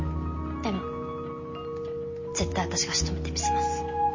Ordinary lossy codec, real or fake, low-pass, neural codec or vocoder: MP3, 32 kbps; real; 7.2 kHz; none